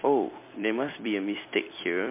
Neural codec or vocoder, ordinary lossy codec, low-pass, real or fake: vocoder, 44.1 kHz, 128 mel bands every 256 samples, BigVGAN v2; MP3, 24 kbps; 3.6 kHz; fake